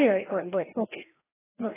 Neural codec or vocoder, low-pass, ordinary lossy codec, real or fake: codec, 16 kHz, 1 kbps, FreqCodec, larger model; 3.6 kHz; AAC, 16 kbps; fake